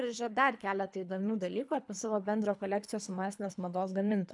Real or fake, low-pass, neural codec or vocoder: fake; 10.8 kHz; codec, 24 kHz, 3 kbps, HILCodec